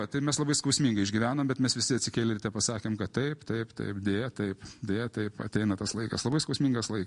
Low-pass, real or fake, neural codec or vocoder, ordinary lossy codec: 10.8 kHz; real; none; MP3, 48 kbps